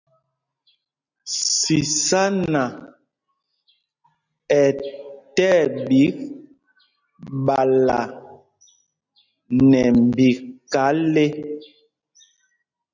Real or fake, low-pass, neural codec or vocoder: real; 7.2 kHz; none